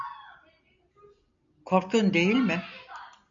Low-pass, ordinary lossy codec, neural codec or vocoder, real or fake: 7.2 kHz; MP3, 96 kbps; none; real